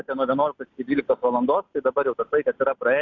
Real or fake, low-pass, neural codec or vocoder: real; 7.2 kHz; none